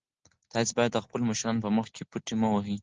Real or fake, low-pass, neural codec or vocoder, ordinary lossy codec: real; 7.2 kHz; none; Opus, 16 kbps